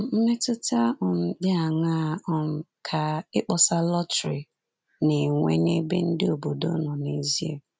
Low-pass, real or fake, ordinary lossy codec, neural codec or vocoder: none; real; none; none